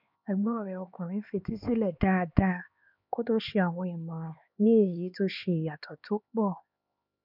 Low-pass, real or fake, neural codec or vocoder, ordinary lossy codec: 5.4 kHz; fake; codec, 16 kHz, 4 kbps, X-Codec, HuBERT features, trained on LibriSpeech; none